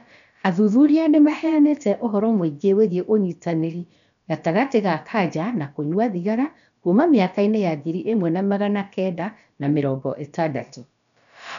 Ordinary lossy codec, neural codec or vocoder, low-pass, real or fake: none; codec, 16 kHz, about 1 kbps, DyCAST, with the encoder's durations; 7.2 kHz; fake